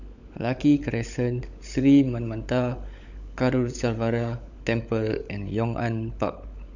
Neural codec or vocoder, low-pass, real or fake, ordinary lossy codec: codec, 16 kHz, 16 kbps, FunCodec, trained on LibriTTS, 50 frames a second; 7.2 kHz; fake; none